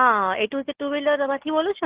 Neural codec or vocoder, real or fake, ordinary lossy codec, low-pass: none; real; Opus, 16 kbps; 3.6 kHz